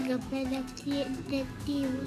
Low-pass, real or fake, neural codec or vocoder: 14.4 kHz; fake; codec, 44.1 kHz, 7.8 kbps, DAC